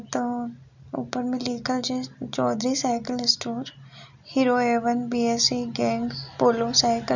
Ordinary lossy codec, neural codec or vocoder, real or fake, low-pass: none; none; real; 7.2 kHz